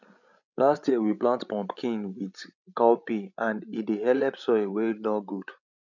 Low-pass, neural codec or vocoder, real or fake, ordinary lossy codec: 7.2 kHz; codec, 16 kHz, 16 kbps, FreqCodec, larger model; fake; none